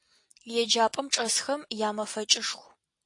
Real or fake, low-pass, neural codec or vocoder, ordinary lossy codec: real; 10.8 kHz; none; AAC, 48 kbps